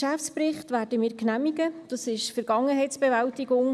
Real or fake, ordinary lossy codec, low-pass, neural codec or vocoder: real; none; none; none